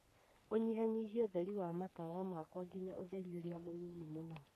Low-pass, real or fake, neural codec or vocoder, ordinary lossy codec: 14.4 kHz; fake; codec, 44.1 kHz, 3.4 kbps, Pupu-Codec; AAC, 96 kbps